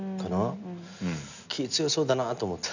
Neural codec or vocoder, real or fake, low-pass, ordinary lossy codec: none; real; 7.2 kHz; none